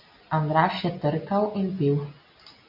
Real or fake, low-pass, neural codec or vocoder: real; 5.4 kHz; none